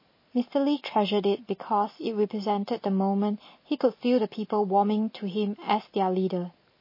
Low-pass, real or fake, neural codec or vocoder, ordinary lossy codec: 5.4 kHz; real; none; MP3, 24 kbps